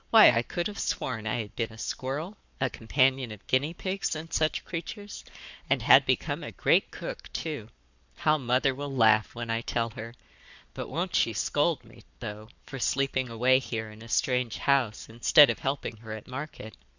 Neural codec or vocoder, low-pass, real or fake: codec, 44.1 kHz, 7.8 kbps, Pupu-Codec; 7.2 kHz; fake